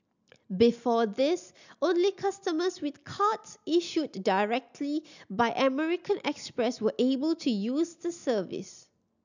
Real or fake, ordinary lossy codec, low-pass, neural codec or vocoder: real; none; 7.2 kHz; none